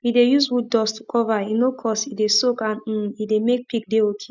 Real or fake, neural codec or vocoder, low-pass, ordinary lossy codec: real; none; 7.2 kHz; none